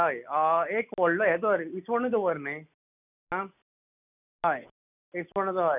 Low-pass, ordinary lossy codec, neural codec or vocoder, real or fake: 3.6 kHz; none; none; real